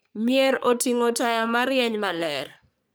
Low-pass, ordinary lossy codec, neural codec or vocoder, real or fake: none; none; codec, 44.1 kHz, 3.4 kbps, Pupu-Codec; fake